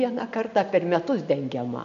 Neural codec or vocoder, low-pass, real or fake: none; 7.2 kHz; real